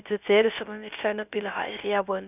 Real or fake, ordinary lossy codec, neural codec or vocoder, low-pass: fake; none; codec, 16 kHz, 0.3 kbps, FocalCodec; 3.6 kHz